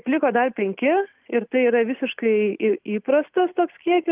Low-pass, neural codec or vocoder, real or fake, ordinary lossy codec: 3.6 kHz; none; real; Opus, 24 kbps